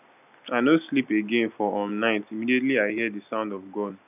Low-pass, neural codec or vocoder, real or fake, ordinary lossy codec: 3.6 kHz; none; real; none